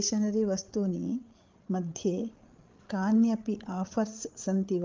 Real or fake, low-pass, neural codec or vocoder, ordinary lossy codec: fake; 7.2 kHz; codec, 16 kHz, 8 kbps, FreqCodec, larger model; Opus, 24 kbps